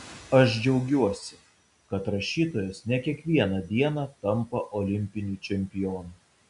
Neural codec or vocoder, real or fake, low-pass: none; real; 10.8 kHz